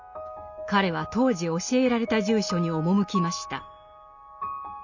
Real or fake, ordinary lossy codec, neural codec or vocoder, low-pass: real; none; none; 7.2 kHz